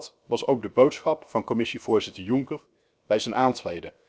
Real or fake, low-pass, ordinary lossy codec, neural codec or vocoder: fake; none; none; codec, 16 kHz, 0.7 kbps, FocalCodec